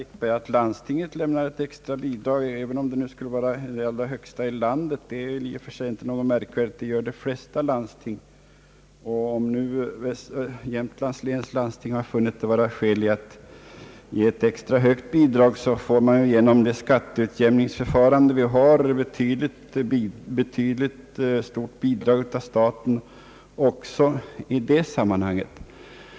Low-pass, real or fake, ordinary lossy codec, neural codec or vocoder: none; real; none; none